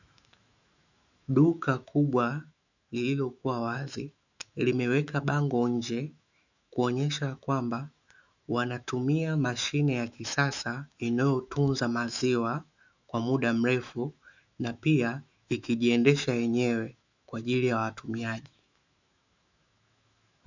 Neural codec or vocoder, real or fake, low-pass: autoencoder, 48 kHz, 128 numbers a frame, DAC-VAE, trained on Japanese speech; fake; 7.2 kHz